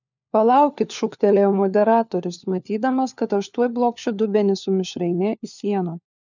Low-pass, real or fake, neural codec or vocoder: 7.2 kHz; fake; codec, 16 kHz, 4 kbps, FunCodec, trained on LibriTTS, 50 frames a second